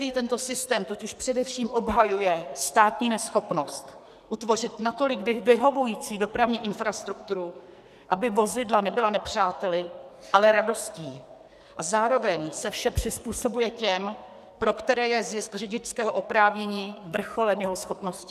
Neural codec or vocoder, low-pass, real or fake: codec, 44.1 kHz, 2.6 kbps, SNAC; 14.4 kHz; fake